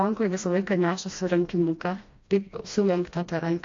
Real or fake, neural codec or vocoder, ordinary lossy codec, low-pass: fake; codec, 16 kHz, 1 kbps, FreqCodec, smaller model; AAC, 48 kbps; 7.2 kHz